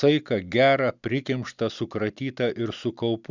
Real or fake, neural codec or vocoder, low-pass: real; none; 7.2 kHz